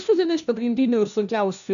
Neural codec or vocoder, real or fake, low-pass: codec, 16 kHz, 1 kbps, FunCodec, trained on LibriTTS, 50 frames a second; fake; 7.2 kHz